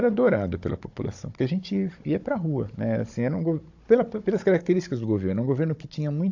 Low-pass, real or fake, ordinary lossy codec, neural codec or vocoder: 7.2 kHz; fake; AAC, 48 kbps; codec, 16 kHz, 16 kbps, FunCodec, trained on Chinese and English, 50 frames a second